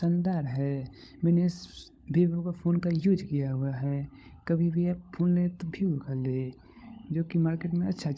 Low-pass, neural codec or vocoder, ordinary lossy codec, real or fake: none; codec, 16 kHz, 16 kbps, FunCodec, trained on LibriTTS, 50 frames a second; none; fake